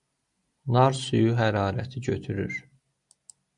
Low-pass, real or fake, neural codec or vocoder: 10.8 kHz; real; none